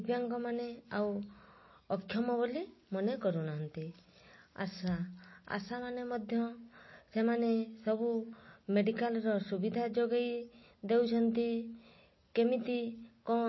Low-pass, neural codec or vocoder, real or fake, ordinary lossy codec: 7.2 kHz; none; real; MP3, 24 kbps